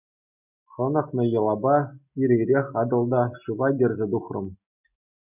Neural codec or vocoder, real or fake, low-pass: none; real; 3.6 kHz